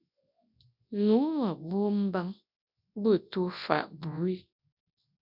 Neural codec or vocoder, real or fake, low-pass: codec, 24 kHz, 0.9 kbps, WavTokenizer, large speech release; fake; 5.4 kHz